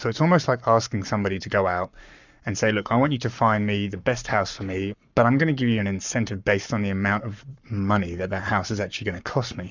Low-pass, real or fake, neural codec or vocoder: 7.2 kHz; fake; codec, 44.1 kHz, 7.8 kbps, Pupu-Codec